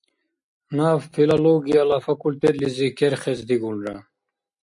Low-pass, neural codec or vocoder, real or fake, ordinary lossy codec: 10.8 kHz; none; real; MP3, 64 kbps